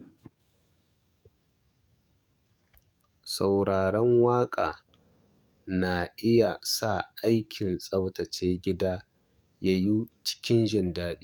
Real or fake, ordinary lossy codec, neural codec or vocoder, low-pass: fake; none; codec, 44.1 kHz, 7.8 kbps, DAC; 19.8 kHz